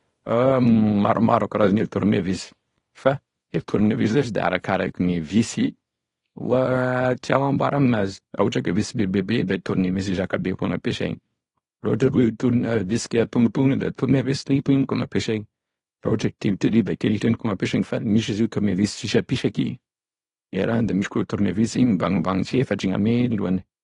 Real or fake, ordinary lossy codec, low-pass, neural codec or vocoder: fake; AAC, 32 kbps; 10.8 kHz; codec, 24 kHz, 0.9 kbps, WavTokenizer, small release